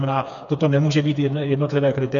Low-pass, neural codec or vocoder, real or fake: 7.2 kHz; codec, 16 kHz, 4 kbps, FreqCodec, smaller model; fake